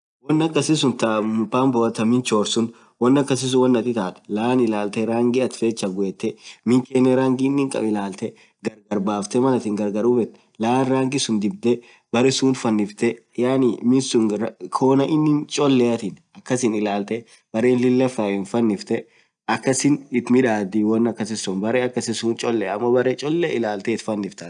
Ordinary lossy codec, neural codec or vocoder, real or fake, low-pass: none; none; real; 9.9 kHz